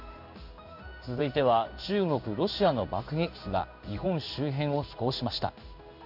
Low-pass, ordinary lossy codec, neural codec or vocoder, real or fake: 5.4 kHz; none; codec, 16 kHz in and 24 kHz out, 1 kbps, XY-Tokenizer; fake